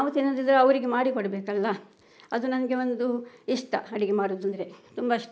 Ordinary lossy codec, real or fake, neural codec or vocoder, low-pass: none; real; none; none